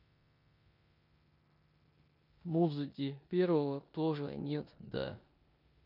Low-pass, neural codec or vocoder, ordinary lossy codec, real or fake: 5.4 kHz; codec, 16 kHz in and 24 kHz out, 0.9 kbps, LongCat-Audio-Codec, four codebook decoder; MP3, 48 kbps; fake